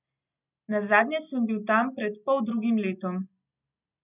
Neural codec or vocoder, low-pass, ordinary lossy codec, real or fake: none; 3.6 kHz; none; real